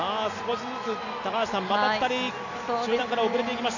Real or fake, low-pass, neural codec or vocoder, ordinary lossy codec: real; 7.2 kHz; none; none